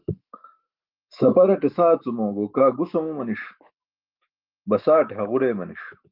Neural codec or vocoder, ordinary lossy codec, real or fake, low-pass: autoencoder, 48 kHz, 128 numbers a frame, DAC-VAE, trained on Japanese speech; Opus, 32 kbps; fake; 5.4 kHz